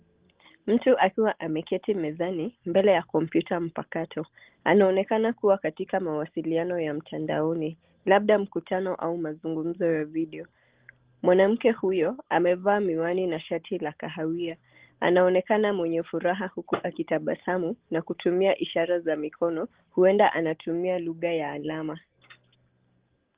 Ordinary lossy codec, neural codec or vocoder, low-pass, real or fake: Opus, 16 kbps; none; 3.6 kHz; real